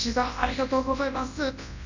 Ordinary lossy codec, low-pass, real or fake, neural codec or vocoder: none; 7.2 kHz; fake; codec, 24 kHz, 0.9 kbps, WavTokenizer, large speech release